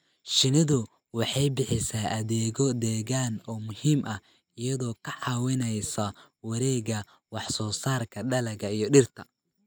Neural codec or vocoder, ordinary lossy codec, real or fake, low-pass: none; none; real; none